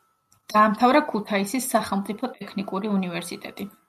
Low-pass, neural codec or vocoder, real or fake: 14.4 kHz; none; real